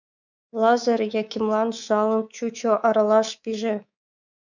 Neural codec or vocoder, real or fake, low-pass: codec, 24 kHz, 3.1 kbps, DualCodec; fake; 7.2 kHz